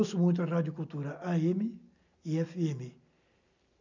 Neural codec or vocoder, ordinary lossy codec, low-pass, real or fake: none; none; 7.2 kHz; real